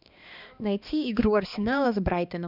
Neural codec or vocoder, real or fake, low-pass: codec, 16 kHz, 2 kbps, X-Codec, HuBERT features, trained on balanced general audio; fake; 5.4 kHz